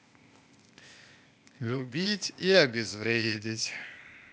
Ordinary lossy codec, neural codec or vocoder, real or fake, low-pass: none; codec, 16 kHz, 0.8 kbps, ZipCodec; fake; none